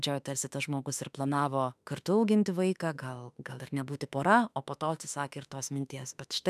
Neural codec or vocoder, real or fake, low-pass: autoencoder, 48 kHz, 32 numbers a frame, DAC-VAE, trained on Japanese speech; fake; 14.4 kHz